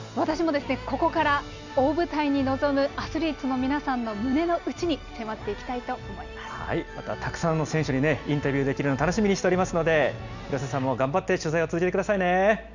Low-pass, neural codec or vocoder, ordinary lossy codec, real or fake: 7.2 kHz; none; none; real